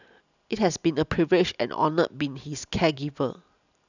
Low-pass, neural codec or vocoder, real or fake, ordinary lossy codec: 7.2 kHz; none; real; none